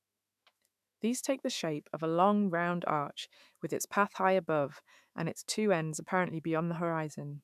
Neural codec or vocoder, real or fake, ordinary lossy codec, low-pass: autoencoder, 48 kHz, 128 numbers a frame, DAC-VAE, trained on Japanese speech; fake; none; 14.4 kHz